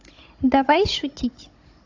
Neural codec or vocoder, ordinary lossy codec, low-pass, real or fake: codec, 16 kHz, 16 kbps, FunCodec, trained on Chinese and English, 50 frames a second; AAC, 48 kbps; 7.2 kHz; fake